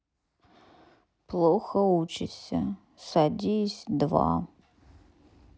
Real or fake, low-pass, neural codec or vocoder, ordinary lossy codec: real; none; none; none